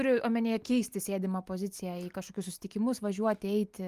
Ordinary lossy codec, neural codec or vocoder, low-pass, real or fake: Opus, 32 kbps; none; 14.4 kHz; real